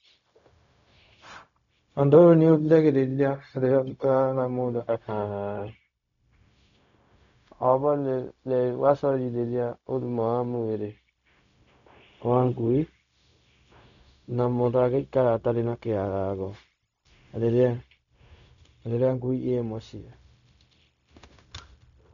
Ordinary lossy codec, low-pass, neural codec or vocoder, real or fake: none; 7.2 kHz; codec, 16 kHz, 0.4 kbps, LongCat-Audio-Codec; fake